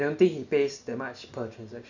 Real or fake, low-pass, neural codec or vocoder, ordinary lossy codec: real; 7.2 kHz; none; none